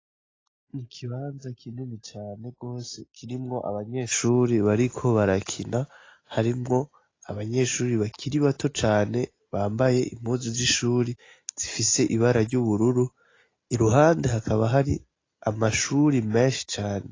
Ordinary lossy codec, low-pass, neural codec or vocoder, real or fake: AAC, 32 kbps; 7.2 kHz; none; real